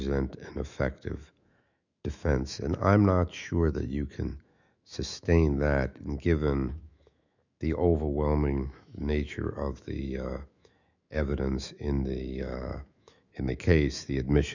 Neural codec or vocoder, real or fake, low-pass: autoencoder, 48 kHz, 128 numbers a frame, DAC-VAE, trained on Japanese speech; fake; 7.2 kHz